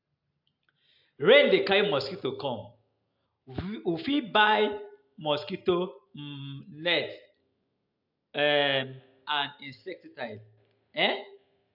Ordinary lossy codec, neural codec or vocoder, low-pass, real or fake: AAC, 48 kbps; none; 5.4 kHz; real